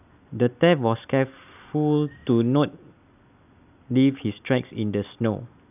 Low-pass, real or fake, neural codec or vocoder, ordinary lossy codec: 3.6 kHz; real; none; none